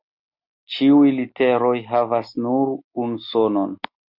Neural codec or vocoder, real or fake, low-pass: none; real; 5.4 kHz